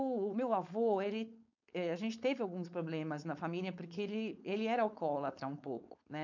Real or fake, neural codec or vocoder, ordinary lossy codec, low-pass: fake; codec, 16 kHz, 4.8 kbps, FACodec; none; 7.2 kHz